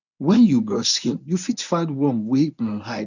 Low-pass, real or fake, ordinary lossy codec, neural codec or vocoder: 7.2 kHz; fake; none; codec, 24 kHz, 0.9 kbps, WavTokenizer, medium speech release version 1